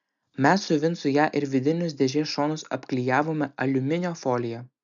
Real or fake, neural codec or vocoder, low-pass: real; none; 7.2 kHz